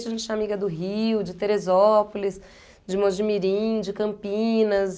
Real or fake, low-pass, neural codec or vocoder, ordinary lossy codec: real; none; none; none